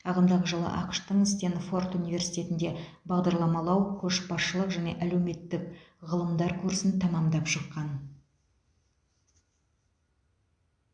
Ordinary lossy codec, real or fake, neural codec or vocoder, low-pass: MP3, 64 kbps; real; none; 9.9 kHz